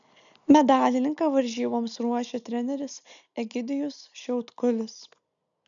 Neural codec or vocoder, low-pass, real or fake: none; 7.2 kHz; real